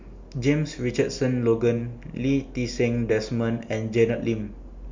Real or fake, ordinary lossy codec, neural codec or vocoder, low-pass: real; MP3, 64 kbps; none; 7.2 kHz